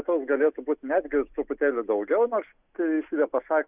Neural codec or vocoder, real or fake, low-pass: none; real; 3.6 kHz